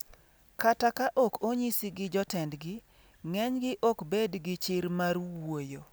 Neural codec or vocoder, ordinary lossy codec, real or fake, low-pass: none; none; real; none